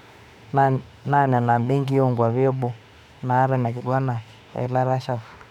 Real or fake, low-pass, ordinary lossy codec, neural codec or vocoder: fake; 19.8 kHz; none; autoencoder, 48 kHz, 32 numbers a frame, DAC-VAE, trained on Japanese speech